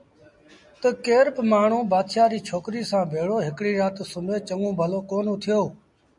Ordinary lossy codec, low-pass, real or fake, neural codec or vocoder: MP3, 96 kbps; 10.8 kHz; real; none